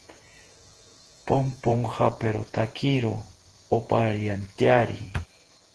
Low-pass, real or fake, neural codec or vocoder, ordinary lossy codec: 10.8 kHz; fake; vocoder, 48 kHz, 128 mel bands, Vocos; Opus, 16 kbps